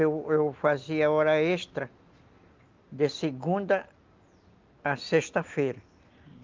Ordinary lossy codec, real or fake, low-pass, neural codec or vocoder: Opus, 24 kbps; real; 7.2 kHz; none